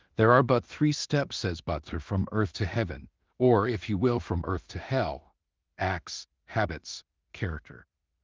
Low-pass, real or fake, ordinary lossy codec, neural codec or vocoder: 7.2 kHz; fake; Opus, 32 kbps; codec, 16 kHz in and 24 kHz out, 0.4 kbps, LongCat-Audio-Codec, two codebook decoder